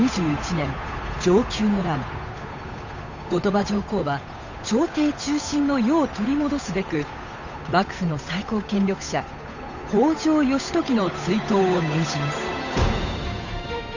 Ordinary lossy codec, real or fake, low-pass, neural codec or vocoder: Opus, 64 kbps; fake; 7.2 kHz; codec, 16 kHz, 8 kbps, FunCodec, trained on Chinese and English, 25 frames a second